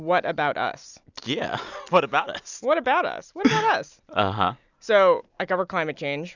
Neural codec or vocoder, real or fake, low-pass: none; real; 7.2 kHz